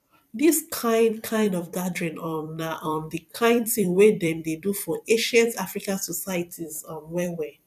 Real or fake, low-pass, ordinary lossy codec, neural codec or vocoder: fake; 14.4 kHz; none; vocoder, 44.1 kHz, 128 mel bands every 256 samples, BigVGAN v2